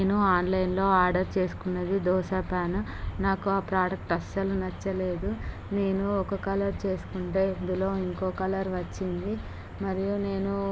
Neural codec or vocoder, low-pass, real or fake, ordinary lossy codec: none; none; real; none